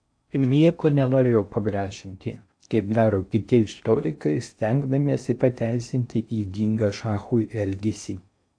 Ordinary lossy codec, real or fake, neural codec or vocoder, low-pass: AAC, 64 kbps; fake; codec, 16 kHz in and 24 kHz out, 0.6 kbps, FocalCodec, streaming, 4096 codes; 9.9 kHz